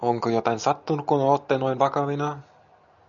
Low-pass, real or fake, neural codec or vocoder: 7.2 kHz; real; none